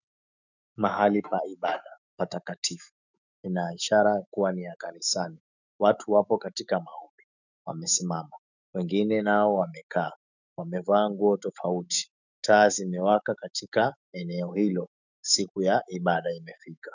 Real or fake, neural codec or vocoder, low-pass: fake; autoencoder, 48 kHz, 128 numbers a frame, DAC-VAE, trained on Japanese speech; 7.2 kHz